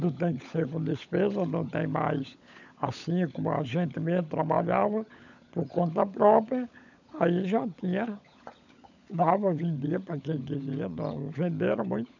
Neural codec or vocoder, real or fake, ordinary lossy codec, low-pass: codec, 16 kHz, 16 kbps, FunCodec, trained on LibriTTS, 50 frames a second; fake; none; 7.2 kHz